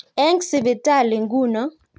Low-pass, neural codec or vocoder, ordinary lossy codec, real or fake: none; none; none; real